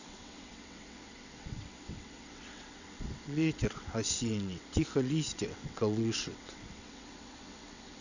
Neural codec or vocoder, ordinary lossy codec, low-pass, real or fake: vocoder, 22.05 kHz, 80 mel bands, WaveNeXt; none; 7.2 kHz; fake